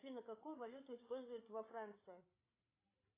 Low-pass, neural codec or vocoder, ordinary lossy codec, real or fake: 3.6 kHz; codec, 16 kHz, 8 kbps, FreqCodec, larger model; AAC, 16 kbps; fake